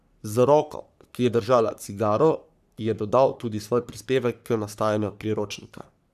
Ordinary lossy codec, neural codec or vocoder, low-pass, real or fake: none; codec, 44.1 kHz, 3.4 kbps, Pupu-Codec; 14.4 kHz; fake